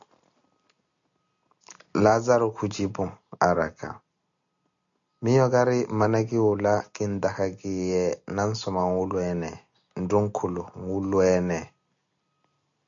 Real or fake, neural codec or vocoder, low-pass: real; none; 7.2 kHz